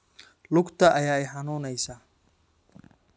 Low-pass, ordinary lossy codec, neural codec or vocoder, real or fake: none; none; none; real